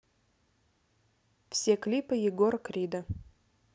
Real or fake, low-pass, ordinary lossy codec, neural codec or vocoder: real; none; none; none